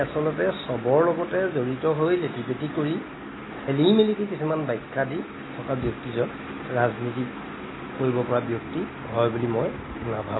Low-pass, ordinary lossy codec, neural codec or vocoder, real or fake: 7.2 kHz; AAC, 16 kbps; none; real